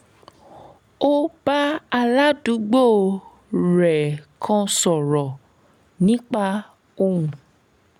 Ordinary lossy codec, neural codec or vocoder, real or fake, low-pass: none; none; real; 19.8 kHz